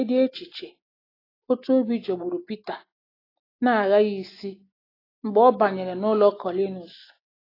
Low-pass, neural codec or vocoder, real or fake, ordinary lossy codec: 5.4 kHz; none; real; AAC, 24 kbps